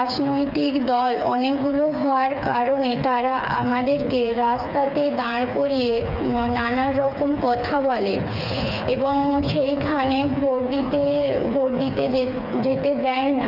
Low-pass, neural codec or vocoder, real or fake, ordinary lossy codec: 5.4 kHz; codec, 16 kHz, 4 kbps, FreqCodec, smaller model; fake; none